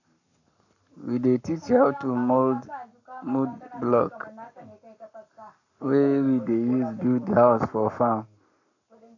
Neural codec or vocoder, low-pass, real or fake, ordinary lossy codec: codec, 44.1 kHz, 7.8 kbps, Pupu-Codec; 7.2 kHz; fake; none